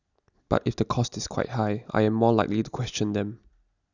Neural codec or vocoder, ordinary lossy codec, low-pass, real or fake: none; none; 7.2 kHz; real